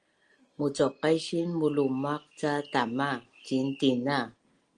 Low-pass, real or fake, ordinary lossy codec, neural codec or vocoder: 9.9 kHz; real; Opus, 24 kbps; none